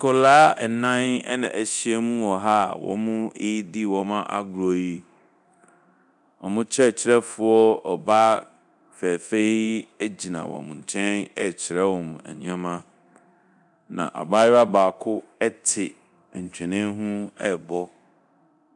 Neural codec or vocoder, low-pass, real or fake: codec, 24 kHz, 0.9 kbps, DualCodec; 10.8 kHz; fake